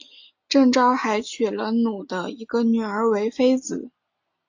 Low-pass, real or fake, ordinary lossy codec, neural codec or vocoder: 7.2 kHz; real; AAC, 48 kbps; none